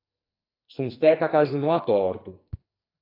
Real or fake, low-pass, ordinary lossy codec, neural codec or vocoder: fake; 5.4 kHz; AAC, 32 kbps; codec, 44.1 kHz, 2.6 kbps, SNAC